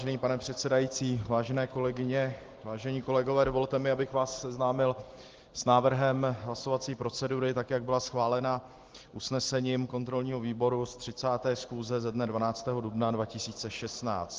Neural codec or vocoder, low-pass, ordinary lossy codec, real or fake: none; 7.2 kHz; Opus, 16 kbps; real